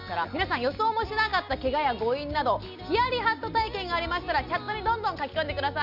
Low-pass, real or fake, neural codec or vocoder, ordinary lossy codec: 5.4 kHz; real; none; none